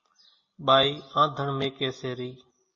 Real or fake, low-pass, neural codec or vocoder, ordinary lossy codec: real; 7.2 kHz; none; MP3, 32 kbps